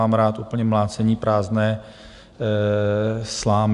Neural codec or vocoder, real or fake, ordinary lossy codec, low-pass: none; real; Opus, 64 kbps; 10.8 kHz